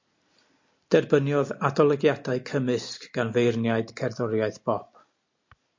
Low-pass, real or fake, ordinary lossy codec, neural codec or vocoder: 7.2 kHz; real; MP3, 48 kbps; none